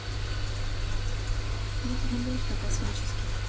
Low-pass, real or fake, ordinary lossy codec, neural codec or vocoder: none; real; none; none